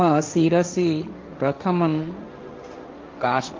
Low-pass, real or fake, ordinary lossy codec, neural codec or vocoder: 7.2 kHz; fake; Opus, 32 kbps; codec, 16 kHz, 1.1 kbps, Voila-Tokenizer